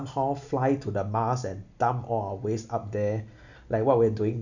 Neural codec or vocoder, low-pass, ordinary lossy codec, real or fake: none; 7.2 kHz; none; real